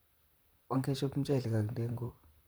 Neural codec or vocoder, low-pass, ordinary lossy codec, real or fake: vocoder, 44.1 kHz, 128 mel bands, Pupu-Vocoder; none; none; fake